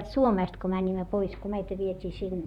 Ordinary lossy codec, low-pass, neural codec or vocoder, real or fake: none; 19.8 kHz; vocoder, 44.1 kHz, 128 mel bands, Pupu-Vocoder; fake